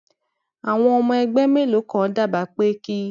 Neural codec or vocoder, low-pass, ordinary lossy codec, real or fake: none; 7.2 kHz; none; real